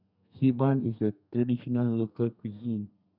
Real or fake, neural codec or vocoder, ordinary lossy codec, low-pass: fake; codec, 32 kHz, 1.9 kbps, SNAC; none; 5.4 kHz